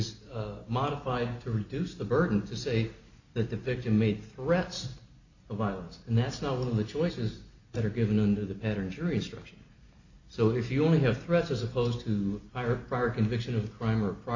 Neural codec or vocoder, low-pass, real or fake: none; 7.2 kHz; real